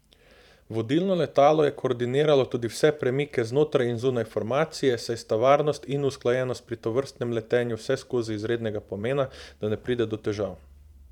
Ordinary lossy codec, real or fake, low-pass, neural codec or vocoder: none; fake; 19.8 kHz; vocoder, 44.1 kHz, 128 mel bands every 512 samples, BigVGAN v2